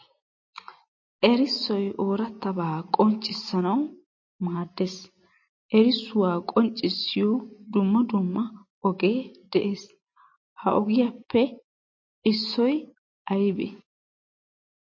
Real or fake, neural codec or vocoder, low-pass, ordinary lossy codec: real; none; 7.2 kHz; MP3, 32 kbps